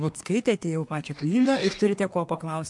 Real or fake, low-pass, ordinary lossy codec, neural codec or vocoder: fake; 10.8 kHz; MP3, 64 kbps; codec, 24 kHz, 1 kbps, SNAC